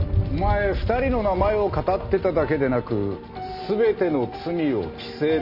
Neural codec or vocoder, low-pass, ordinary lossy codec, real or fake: none; 5.4 kHz; none; real